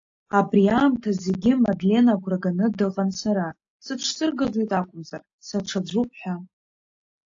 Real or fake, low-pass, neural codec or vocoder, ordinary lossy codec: real; 7.2 kHz; none; AAC, 48 kbps